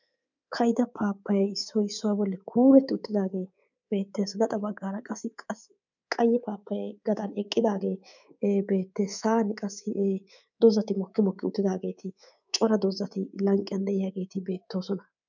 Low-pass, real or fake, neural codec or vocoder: 7.2 kHz; fake; codec, 24 kHz, 3.1 kbps, DualCodec